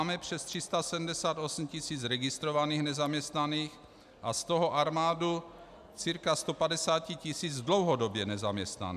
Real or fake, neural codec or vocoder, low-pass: real; none; 14.4 kHz